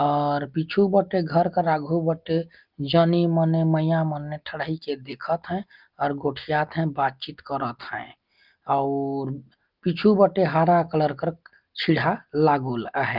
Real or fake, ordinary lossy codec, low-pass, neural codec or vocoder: real; Opus, 32 kbps; 5.4 kHz; none